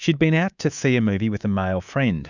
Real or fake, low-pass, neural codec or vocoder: fake; 7.2 kHz; codec, 24 kHz, 3.1 kbps, DualCodec